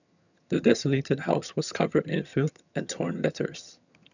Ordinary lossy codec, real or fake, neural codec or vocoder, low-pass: none; fake; vocoder, 22.05 kHz, 80 mel bands, HiFi-GAN; 7.2 kHz